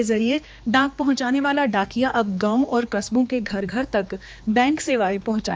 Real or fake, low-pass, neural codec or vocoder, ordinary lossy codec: fake; none; codec, 16 kHz, 2 kbps, X-Codec, HuBERT features, trained on general audio; none